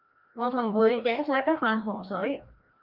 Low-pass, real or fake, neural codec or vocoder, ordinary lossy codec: 5.4 kHz; fake; codec, 16 kHz, 1 kbps, FreqCodec, larger model; Opus, 24 kbps